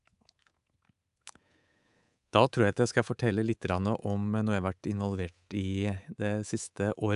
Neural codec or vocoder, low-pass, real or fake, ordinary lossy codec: codec, 24 kHz, 3.1 kbps, DualCodec; 10.8 kHz; fake; AAC, 96 kbps